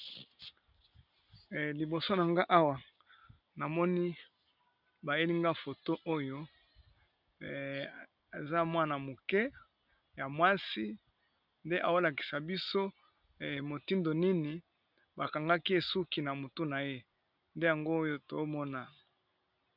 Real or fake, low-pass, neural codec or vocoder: real; 5.4 kHz; none